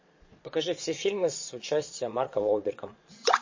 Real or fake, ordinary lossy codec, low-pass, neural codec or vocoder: fake; MP3, 32 kbps; 7.2 kHz; vocoder, 22.05 kHz, 80 mel bands, Vocos